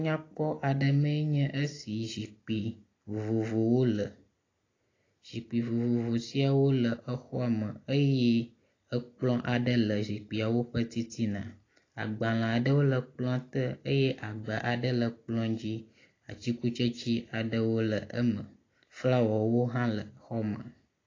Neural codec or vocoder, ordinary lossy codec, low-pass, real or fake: none; AAC, 32 kbps; 7.2 kHz; real